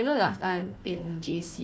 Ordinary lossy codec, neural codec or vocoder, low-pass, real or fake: none; codec, 16 kHz, 1 kbps, FunCodec, trained on Chinese and English, 50 frames a second; none; fake